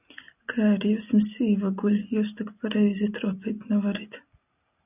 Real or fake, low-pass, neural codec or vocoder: real; 3.6 kHz; none